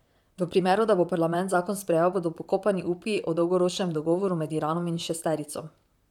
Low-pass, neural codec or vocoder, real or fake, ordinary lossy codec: 19.8 kHz; vocoder, 44.1 kHz, 128 mel bands, Pupu-Vocoder; fake; none